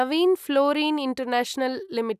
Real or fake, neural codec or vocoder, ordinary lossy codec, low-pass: real; none; none; 14.4 kHz